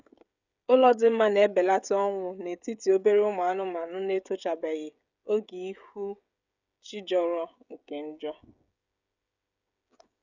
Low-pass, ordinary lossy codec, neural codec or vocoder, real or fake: 7.2 kHz; none; codec, 16 kHz, 16 kbps, FreqCodec, smaller model; fake